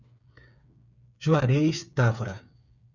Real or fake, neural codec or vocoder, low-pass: fake; codec, 16 kHz, 8 kbps, FreqCodec, smaller model; 7.2 kHz